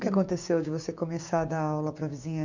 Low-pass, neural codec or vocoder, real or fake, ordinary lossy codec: 7.2 kHz; codec, 16 kHz, 6 kbps, DAC; fake; none